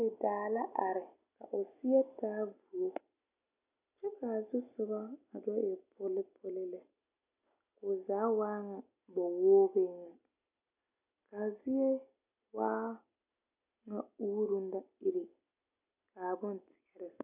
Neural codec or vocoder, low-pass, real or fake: none; 3.6 kHz; real